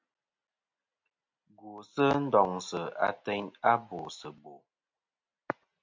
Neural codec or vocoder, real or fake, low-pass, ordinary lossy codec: none; real; 7.2 kHz; MP3, 64 kbps